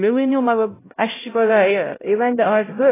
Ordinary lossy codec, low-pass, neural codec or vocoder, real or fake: AAC, 16 kbps; 3.6 kHz; codec, 16 kHz, 0.5 kbps, X-Codec, HuBERT features, trained on LibriSpeech; fake